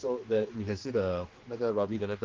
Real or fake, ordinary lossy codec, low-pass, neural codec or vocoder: fake; Opus, 16 kbps; 7.2 kHz; codec, 16 kHz, 2 kbps, X-Codec, HuBERT features, trained on general audio